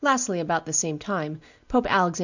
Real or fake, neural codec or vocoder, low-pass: real; none; 7.2 kHz